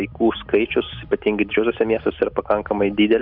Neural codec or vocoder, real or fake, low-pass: none; real; 5.4 kHz